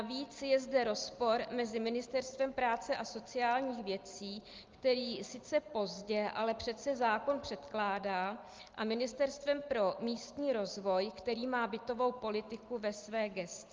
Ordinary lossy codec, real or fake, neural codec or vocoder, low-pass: Opus, 32 kbps; real; none; 7.2 kHz